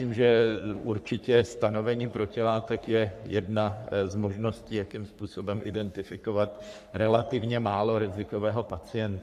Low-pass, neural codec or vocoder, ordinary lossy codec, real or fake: 14.4 kHz; codec, 44.1 kHz, 3.4 kbps, Pupu-Codec; AAC, 96 kbps; fake